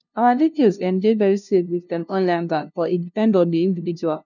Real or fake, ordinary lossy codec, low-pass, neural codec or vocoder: fake; none; 7.2 kHz; codec, 16 kHz, 0.5 kbps, FunCodec, trained on LibriTTS, 25 frames a second